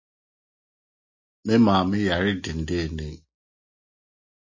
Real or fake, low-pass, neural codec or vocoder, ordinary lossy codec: real; 7.2 kHz; none; MP3, 32 kbps